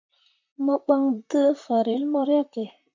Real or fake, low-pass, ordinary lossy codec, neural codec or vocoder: fake; 7.2 kHz; MP3, 48 kbps; vocoder, 44.1 kHz, 128 mel bands, Pupu-Vocoder